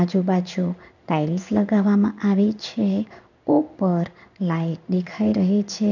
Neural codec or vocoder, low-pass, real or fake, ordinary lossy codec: codec, 16 kHz in and 24 kHz out, 1 kbps, XY-Tokenizer; 7.2 kHz; fake; none